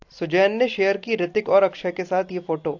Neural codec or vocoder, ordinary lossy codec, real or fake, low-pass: none; AAC, 48 kbps; real; 7.2 kHz